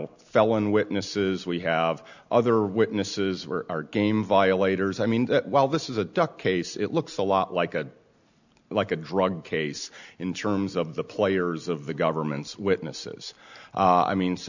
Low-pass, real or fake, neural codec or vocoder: 7.2 kHz; real; none